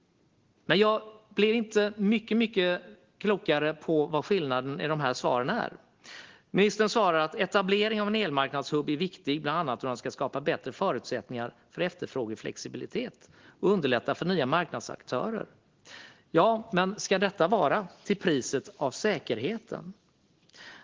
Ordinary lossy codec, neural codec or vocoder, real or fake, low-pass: Opus, 16 kbps; none; real; 7.2 kHz